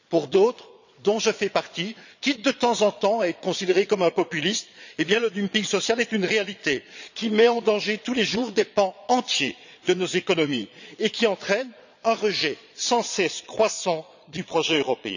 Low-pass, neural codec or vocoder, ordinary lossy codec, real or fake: 7.2 kHz; vocoder, 22.05 kHz, 80 mel bands, Vocos; none; fake